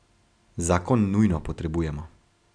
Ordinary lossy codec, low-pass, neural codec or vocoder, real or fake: none; 9.9 kHz; none; real